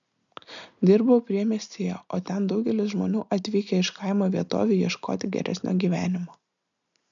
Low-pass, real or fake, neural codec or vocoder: 7.2 kHz; real; none